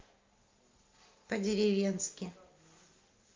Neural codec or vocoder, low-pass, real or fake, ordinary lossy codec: none; 7.2 kHz; real; Opus, 32 kbps